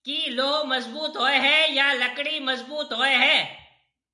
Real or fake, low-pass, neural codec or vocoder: real; 10.8 kHz; none